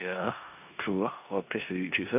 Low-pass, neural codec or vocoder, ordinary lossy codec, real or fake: 3.6 kHz; codec, 16 kHz in and 24 kHz out, 0.9 kbps, LongCat-Audio-Codec, four codebook decoder; none; fake